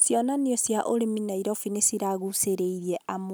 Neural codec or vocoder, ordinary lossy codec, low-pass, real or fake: none; none; none; real